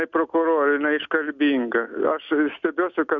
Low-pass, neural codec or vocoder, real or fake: 7.2 kHz; none; real